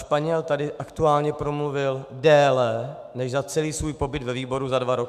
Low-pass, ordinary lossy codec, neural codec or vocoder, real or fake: 14.4 kHz; MP3, 96 kbps; autoencoder, 48 kHz, 128 numbers a frame, DAC-VAE, trained on Japanese speech; fake